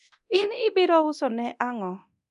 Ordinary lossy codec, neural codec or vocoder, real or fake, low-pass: none; codec, 24 kHz, 0.9 kbps, DualCodec; fake; 10.8 kHz